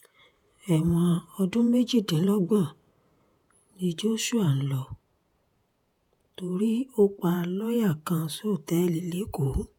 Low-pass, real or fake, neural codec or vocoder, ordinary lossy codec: 19.8 kHz; fake; vocoder, 48 kHz, 128 mel bands, Vocos; none